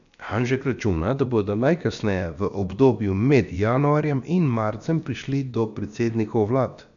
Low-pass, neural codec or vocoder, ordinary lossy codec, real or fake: 7.2 kHz; codec, 16 kHz, about 1 kbps, DyCAST, with the encoder's durations; none; fake